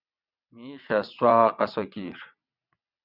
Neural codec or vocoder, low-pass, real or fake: vocoder, 22.05 kHz, 80 mel bands, WaveNeXt; 5.4 kHz; fake